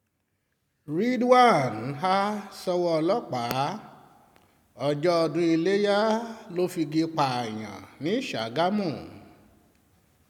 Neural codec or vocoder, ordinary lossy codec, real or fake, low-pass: none; none; real; 19.8 kHz